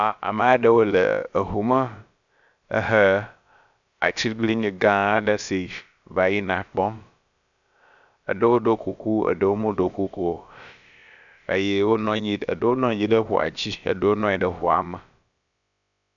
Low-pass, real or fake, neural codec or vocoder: 7.2 kHz; fake; codec, 16 kHz, about 1 kbps, DyCAST, with the encoder's durations